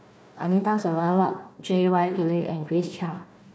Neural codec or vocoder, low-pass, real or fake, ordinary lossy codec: codec, 16 kHz, 1 kbps, FunCodec, trained on Chinese and English, 50 frames a second; none; fake; none